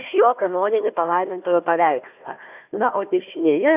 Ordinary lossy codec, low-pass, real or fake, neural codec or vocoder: AAC, 32 kbps; 3.6 kHz; fake; codec, 16 kHz, 1 kbps, FunCodec, trained on Chinese and English, 50 frames a second